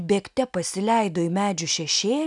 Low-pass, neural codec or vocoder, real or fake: 10.8 kHz; none; real